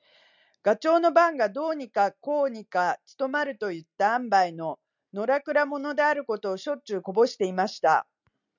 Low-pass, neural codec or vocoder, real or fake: 7.2 kHz; none; real